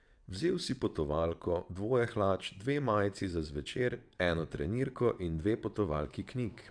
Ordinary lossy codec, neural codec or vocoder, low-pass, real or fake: none; vocoder, 22.05 kHz, 80 mel bands, WaveNeXt; 9.9 kHz; fake